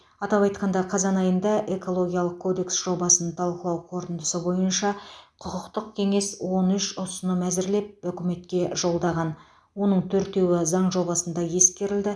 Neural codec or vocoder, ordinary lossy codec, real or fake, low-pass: none; none; real; none